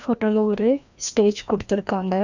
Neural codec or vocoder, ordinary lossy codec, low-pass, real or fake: codec, 16 kHz, 1 kbps, FreqCodec, larger model; none; 7.2 kHz; fake